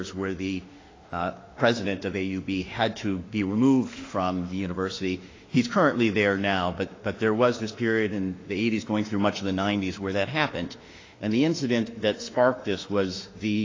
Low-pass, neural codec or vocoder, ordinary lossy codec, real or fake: 7.2 kHz; autoencoder, 48 kHz, 32 numbers a frame, DAC-VAE, trained on Japanese speech; AAC, 32 kbps; fake